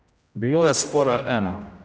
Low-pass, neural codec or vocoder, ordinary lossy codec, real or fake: none; codec, 16 kHz, 0.5 kbps, X-Codec, HuBERT features, trained on general audio; none; fake